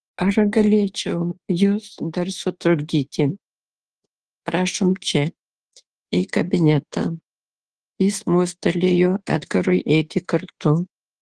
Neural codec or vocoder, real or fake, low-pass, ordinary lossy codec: codec, 24 kHz, 1.2 kbps, DualCodec; fake; 10.8 kHz; Opus, 16 kbps